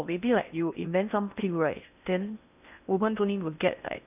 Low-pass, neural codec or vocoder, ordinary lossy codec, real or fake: 3.6 kHz; codec, 16 kHz in and 24 kHz out, 0.6 kbps, FocalCodec, streaming, 4096 codes; none; fake